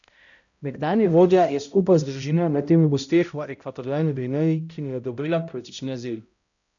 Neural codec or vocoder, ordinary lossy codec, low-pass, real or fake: codec, 16 kHz, 0.5 kbps, X-Codec, HuBERT features, trained on balanced general audio; none; 7.2 kHz; fake